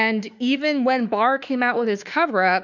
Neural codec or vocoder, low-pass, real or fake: autoencoder, 48 kHz, 32 numbers a frame, DAC-VAE, trained on Japanese speech; 7.2 kHz; fake